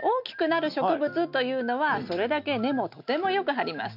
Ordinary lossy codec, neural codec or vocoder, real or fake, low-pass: none; none; real; 5.4 kHz